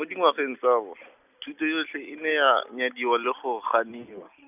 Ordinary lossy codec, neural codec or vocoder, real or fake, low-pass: none; none; real; 3.6 kHz